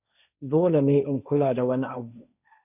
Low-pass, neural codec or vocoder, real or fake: 3.6 kHz; codec, 16 kHz, 1.1 kbps, Voila-Tokenizer; fake